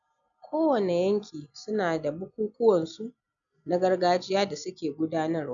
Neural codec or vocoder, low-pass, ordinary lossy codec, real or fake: none; 7.2 kHz; none; real